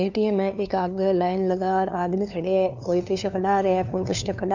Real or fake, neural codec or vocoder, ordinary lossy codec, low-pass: fake; codec, 16 kHz, 2 kbps, FunCodec, trained on LibriTTS, 25 frames a second; none; 7.2 kHz